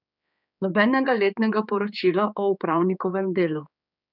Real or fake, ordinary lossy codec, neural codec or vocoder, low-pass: fake; none; codec, 16 kHz, 4 kbps, X-Codec, HuBERT features, trained on general audio; 5.4 kHz